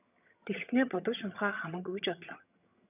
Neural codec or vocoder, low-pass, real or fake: vocoder, 22.05 kHz, 80 mel bands, HiFi-GAN; 3.6 kHz; fake